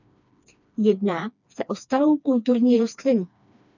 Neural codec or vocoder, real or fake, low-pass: codec, 16 kHz, 2 kbps, FreqCodec, smaller model; fake; 7.2 kHz